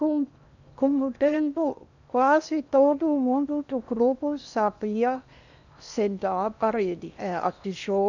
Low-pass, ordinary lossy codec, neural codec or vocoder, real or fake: 7.2 kHz; none; codec, 16 kHz in and 24 kHz out, 0.8 kbps, FocalCodec, streaming, 65536 codes; fake